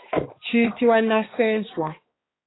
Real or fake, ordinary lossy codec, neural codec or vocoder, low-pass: fake; AAC, 16 kbps; codec, 16 kHz, 2 kbps, X-Codec, HuBERT features, trained on balanced general audio; 7.2 kHz